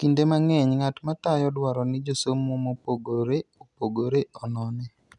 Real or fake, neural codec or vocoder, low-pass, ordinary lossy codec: real; none; 10.8 kHz; none